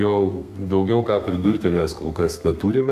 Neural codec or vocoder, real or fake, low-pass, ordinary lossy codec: codec, 32 kHz, 1.9 kbps, SNAC; fake; 14.4 kHz; AAC, 96 kbps